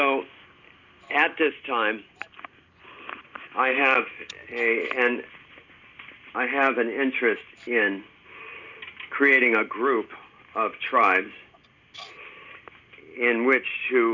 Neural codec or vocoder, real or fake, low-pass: none; real; 7.2 kHz